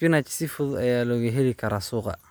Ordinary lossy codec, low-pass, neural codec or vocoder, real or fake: none; none; none; real